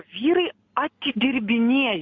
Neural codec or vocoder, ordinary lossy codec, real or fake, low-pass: none; MP3, 48 kbps; real; 7.2 kHz